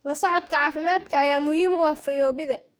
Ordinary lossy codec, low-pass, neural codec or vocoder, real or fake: none; none; codec, 44.1 kHz, 2.6 kbps, DAC; fake